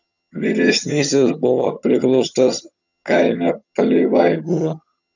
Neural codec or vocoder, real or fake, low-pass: vocoder, 22.05 kHz, 80 mel bands, HiFi-GAN; fake; 7.2 kHz